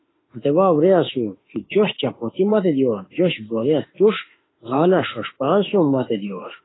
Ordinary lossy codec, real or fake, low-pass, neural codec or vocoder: AAC, 16 kbps; fake; 7.2 kHz; autoencoder, 48 kHz, 32 numbers a frame, DAC-VAE, trained on Japanese speech